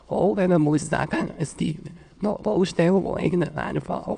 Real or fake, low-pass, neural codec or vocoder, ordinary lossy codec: fake; 9.9 kHz; autoencoder, 22.05 kHz, a latent of 192 numbers a frame, VITS, trained on many speakers; none